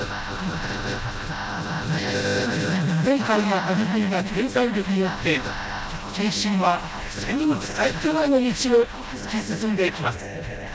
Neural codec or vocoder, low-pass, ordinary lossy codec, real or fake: codec, 16 kHz, 0.5 kbps, FreqCodec, smaller model; none; none; fake